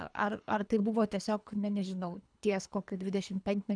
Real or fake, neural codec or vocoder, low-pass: fake; codec, 24 kHz, 3 kbps, HILCodec; 9.9 kHz